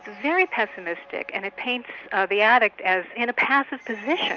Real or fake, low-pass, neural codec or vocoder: real; 7.2 kHz; none